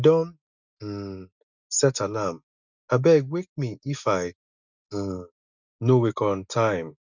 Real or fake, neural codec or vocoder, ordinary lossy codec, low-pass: real; none; none; 7.2 kHz